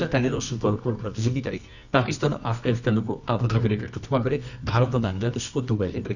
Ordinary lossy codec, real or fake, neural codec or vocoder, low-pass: none; fake; codec, 24 kHz, 0.9 kbps, WavTokenizer, medium music audio release; 7.2 kHz